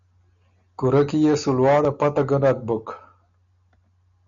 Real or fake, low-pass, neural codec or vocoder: real; 7.2 kHz; none